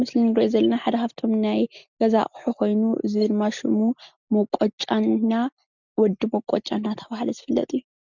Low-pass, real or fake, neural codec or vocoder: 7.2 kHz; real; none